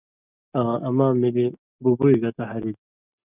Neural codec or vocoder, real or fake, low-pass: none; real; 3.6 kHz